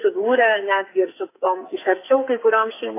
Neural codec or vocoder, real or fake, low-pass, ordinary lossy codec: codec, 44.1 kHz, 2.6 kbps, SNAC; fake; 3.6 kHz; AAC, 24 kbps